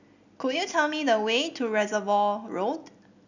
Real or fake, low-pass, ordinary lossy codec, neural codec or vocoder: real; 7.2 kHz; none; none